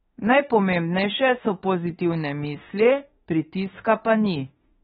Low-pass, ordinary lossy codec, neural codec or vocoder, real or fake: 19.8 kHz; AAC, 16 kbps; autoencoder, 48 kHz, 32 numbers a frame, DAC-VAE, trained on Japanese speech; fake